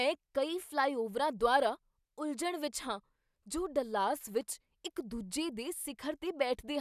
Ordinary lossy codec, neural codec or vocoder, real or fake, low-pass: none; none; real; 14.4 kHz